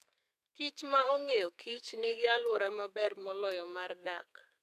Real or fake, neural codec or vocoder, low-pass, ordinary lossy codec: fake; codec, 32 kHz, 1.9 kbps, SNAC; 14.4 kHz; none